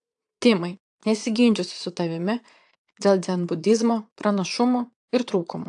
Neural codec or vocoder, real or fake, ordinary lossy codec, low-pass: vocoder, 22.05 kHz, 80 mel bands, Vocos; fake; AAC, 64 kbps; 9.9 kHz